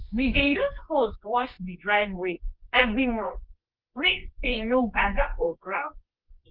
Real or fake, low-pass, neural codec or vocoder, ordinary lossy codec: fake; 5.4 kHz; codec, 24 kHz, 0.9 kbps, WavTokenizer, medium music audio release; Opus, 32 kbps